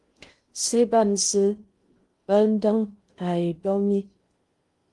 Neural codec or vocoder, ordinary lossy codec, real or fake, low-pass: codec, 16 kHz in and 24 kHz out, 0.6 kbps, FocalCodec, streaming, 2048 codes; Opus, 24 kbps; fake; 10.8 kHz